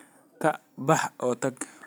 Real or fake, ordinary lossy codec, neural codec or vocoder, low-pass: real; none; none; none